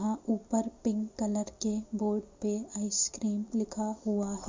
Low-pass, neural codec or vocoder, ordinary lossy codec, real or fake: 7.2 kHz; none; MP3, 48 kbps; real